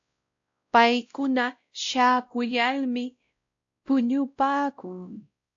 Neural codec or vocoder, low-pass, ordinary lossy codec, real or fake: codec, 16 kHz, 0.5 kbps, X-Codec, WavLM features, trained on Multilingual LibriSpeech; 7.2 kHz; AAC, 64 kbps; fake